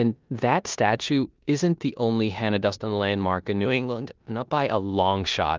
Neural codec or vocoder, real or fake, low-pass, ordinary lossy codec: codec, 16 kHz in and 24 kHz out, 0.9 kbps, LongCat-Audio-Codec, four codebook decoder; fake; 7.2 kHz; Opus, 32 kbps